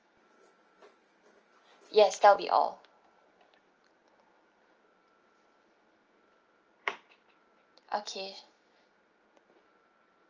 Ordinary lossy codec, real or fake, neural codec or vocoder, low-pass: Opus, 24 kbps; real; none; 7.2 kHz